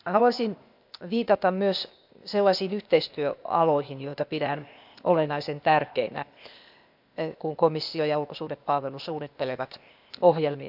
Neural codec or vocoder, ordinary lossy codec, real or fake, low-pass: codec, 16 kHz, 0.8 kbps, ZipCodec; none; fake; 5.4 kHz